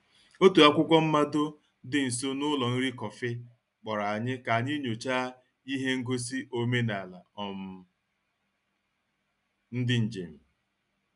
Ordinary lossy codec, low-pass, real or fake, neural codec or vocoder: none; 10.8 kHz; real; none